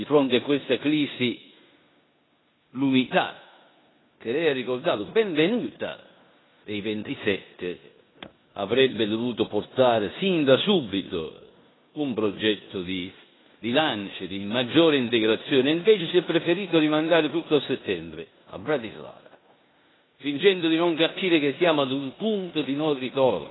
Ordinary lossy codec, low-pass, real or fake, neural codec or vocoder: AAC, 16 kbps; 7.2 kHz; fake; codec, 16 kHz in and 24 kHz out, 0.9 kbps, LongCat-Audio-Codec, four codebook decoder